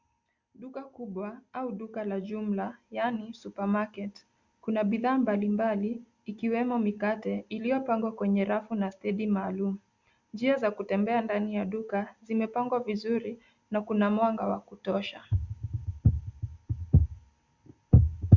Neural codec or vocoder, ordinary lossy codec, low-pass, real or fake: none; Opus, 64 kbps; 7.2 kHz; real